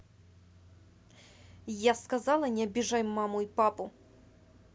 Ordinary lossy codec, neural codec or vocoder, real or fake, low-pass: none; none; real; none